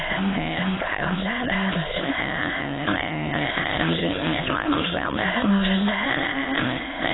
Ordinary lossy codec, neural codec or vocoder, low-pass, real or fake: AAC, 16 kbps; autoencoder, 22.05 kHz, a latent of 192 numbers a frame, VITS, trained on many speakers; 7.2 kHz; fake